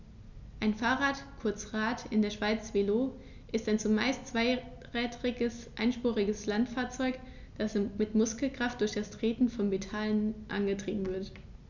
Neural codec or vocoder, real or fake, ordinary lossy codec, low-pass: none; real; none; 7.2 kHz